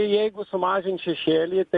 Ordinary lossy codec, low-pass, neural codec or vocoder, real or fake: Opus, 64 kbps; 10.8 kHz; none; real